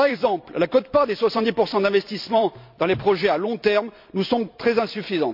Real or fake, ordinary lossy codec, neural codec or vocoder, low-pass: fake; MP3, 48 kbps; vocoder, 44.1 kHz, 128 mel bands every 256 samples, BigVGAN v2; 5.4 kHz